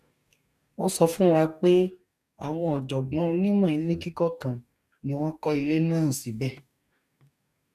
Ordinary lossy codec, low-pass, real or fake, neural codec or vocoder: none; 14.4 kHz; fake; codec, 44.1 kHz, 2.6 kbps, DAC